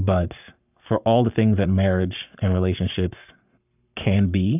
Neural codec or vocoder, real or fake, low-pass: codec, 44.1 kHz, 7.8 kbps, Pupu-Codec; fake; 3.6 kHz